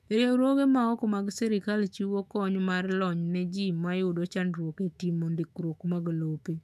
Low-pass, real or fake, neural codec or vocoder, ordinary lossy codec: 14.4 kHz; fake; autoencoder, 48 kHz, 128 numbers a frame, DAC-VAE, trained on Japanese speech; none